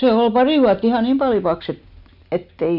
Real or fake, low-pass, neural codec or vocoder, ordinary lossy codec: real; 5.4 kHz; none; none